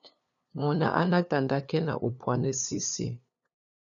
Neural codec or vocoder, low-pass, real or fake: codec, 16 kHz, 2 kbps, FunCodec, trained on LibriTTS, 25 frames a second; 7.2 kHz; fake